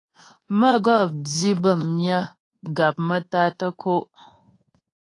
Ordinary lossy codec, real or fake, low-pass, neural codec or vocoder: AAC, 32 kbps; fake; 10.8 kHz; codec, 24 kHz, 1.2 kbps, DualCodec